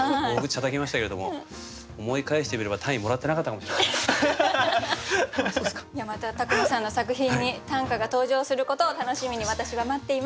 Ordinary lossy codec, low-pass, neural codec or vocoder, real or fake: none; none; none; real